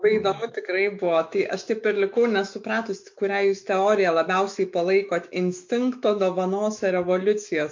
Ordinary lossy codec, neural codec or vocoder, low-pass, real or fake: MP3, 48 kbps; autoencoder, 48 kHz, 128 numbers a frame, DAC-VAE, trained on Japanese speech; 7.2 kHz; fake